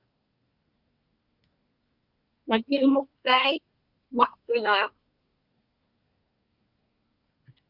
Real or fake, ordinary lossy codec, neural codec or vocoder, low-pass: fake; Opus, 64 kbps; codec, 24 kHz, 1 kbps, SNAC; 5.4 kHz